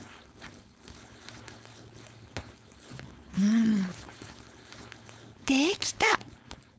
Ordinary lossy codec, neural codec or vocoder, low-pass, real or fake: none; codec, 16 kHz, 4.8 kbps, FACodec; none; fake